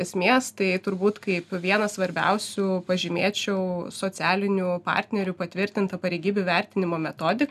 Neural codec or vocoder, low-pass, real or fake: none; 14.4 kHz; real